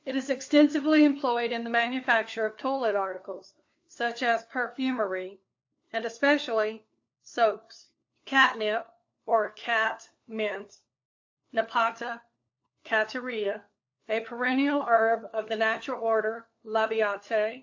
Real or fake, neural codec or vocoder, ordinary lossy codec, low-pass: fake; codec, 16 kHz, 4 kbps, FunCodec, trained on LibriTTS, 50 frames a second; AAC, 48 kbps; 7.2 kHz